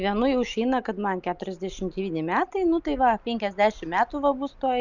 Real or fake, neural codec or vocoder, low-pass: real; none; 7.2 kHz